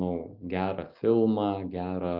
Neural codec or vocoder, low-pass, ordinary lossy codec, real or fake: none; 5.4 kHz; Opus, 24 kbps; real